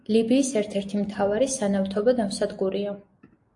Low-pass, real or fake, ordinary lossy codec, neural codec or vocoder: 10.8 kHz; real; AAC, 64 kbps; none